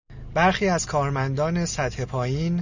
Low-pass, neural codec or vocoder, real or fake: 7.2 kHz; none; real